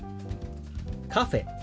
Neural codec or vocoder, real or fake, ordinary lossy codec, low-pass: none; real; none; none